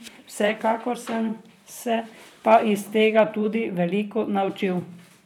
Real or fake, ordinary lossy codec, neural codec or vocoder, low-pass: fake; none; vocoder, 44.1 kHz, 128 mel bands, Pupu-Vocoder; 19.8 kHz